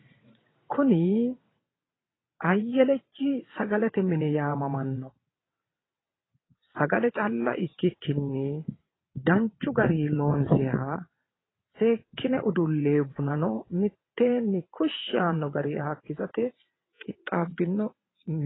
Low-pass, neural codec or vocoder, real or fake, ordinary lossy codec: 7.2 kHz; vocoder, 22.05 kHz, 80 mel bands, WaveNeXt; fake; AAC, 16 kbps